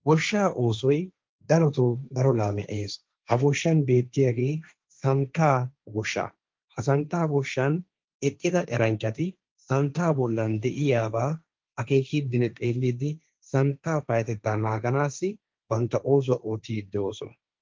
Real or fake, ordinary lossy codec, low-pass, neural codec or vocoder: fake; Opus, 24 kbps; 7.2 kHz; codec, 16 kHz, 1.1 kbps, Voila-Tokenizer